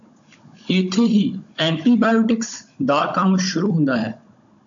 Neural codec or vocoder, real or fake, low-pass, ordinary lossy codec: codec, 16 kHz, 16 kbps, FunCodec, trained on Chinese and English, 50 frames a second; fake; 7.2 kHz; AAC, 64 kbps